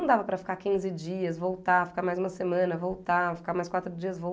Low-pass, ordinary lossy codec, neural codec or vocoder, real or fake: none; none; none; real